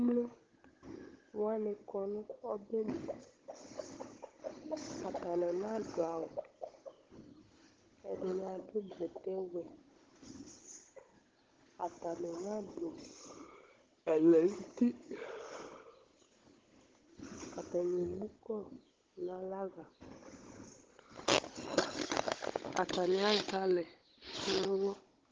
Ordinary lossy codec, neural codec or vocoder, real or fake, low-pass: Opus, 16 kbps; codec, 16 kHz, 16 kbps, FunCodec, trained on Chinese and English, 50 frames a second; fake; 7.2 kHz